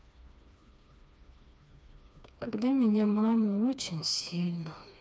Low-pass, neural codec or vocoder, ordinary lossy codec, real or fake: none; codec, 16 kHz, 2 kbps, FreqCodec, smaller model; none; fake